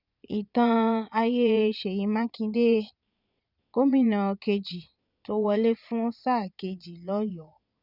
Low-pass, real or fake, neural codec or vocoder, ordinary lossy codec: 5.4 kHz; fake; vocoder, 22.05 kHz, 80 mel bands, Vocos; none